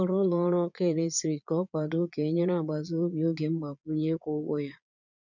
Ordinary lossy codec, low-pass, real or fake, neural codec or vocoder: none; 7.2 kHz; fake; vocoder, 22.05 kHz, 80 mel bands, Vocos